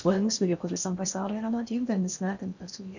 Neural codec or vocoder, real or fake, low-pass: codec, 16 kHz in and 24 kHz out, 0.6 kbps, FocalCodec, streaming, 4096 codes; fake; 7.2 kHz